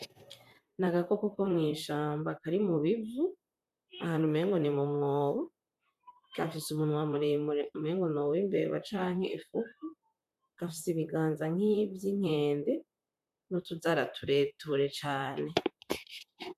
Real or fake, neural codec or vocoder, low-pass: fake; vocoder, 44.1 kHz, 128 mel bands, Pupu-Vocoder; 14.4 kHz